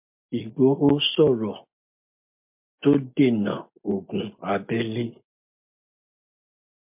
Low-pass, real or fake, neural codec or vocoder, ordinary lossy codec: 3.6 kHz; real; none; MP3, 32 kbps